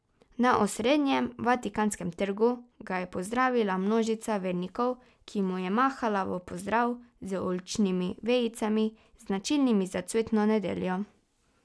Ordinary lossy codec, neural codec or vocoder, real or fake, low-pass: none; none; real; none